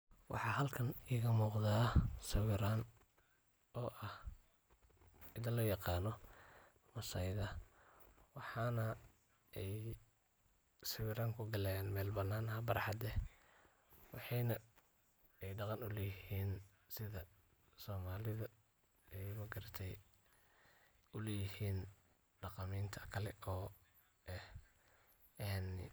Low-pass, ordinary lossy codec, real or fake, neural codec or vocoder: none; none; real; none